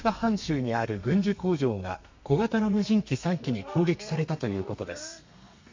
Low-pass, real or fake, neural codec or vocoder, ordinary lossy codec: 7.2 kHz; fake; codec, 32 kHz, 1.9 kbps, SNAC; MP3, 48 kbps